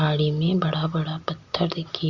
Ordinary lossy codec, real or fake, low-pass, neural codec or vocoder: Opus, 64 kbps; real; 7.2 kHz; none